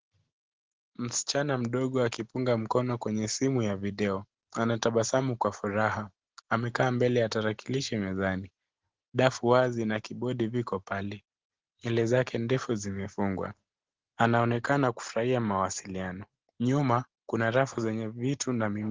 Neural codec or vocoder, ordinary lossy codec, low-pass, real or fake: none; Opus, 16 kbps; 7.2 kHz; real